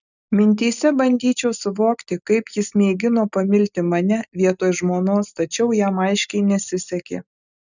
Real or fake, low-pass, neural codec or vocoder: real; 7.2 kHz; none